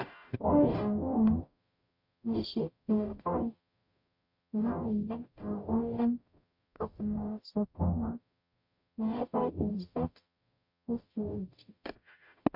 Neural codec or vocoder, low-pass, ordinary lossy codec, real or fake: codec, 44.1 kHz, 0.9 kbps, DAC; 5.4 kHz; MP3, 48 kbps; fake